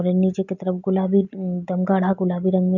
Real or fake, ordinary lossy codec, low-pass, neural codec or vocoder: real; MP3, 64 kbps; 7.2 kHz; none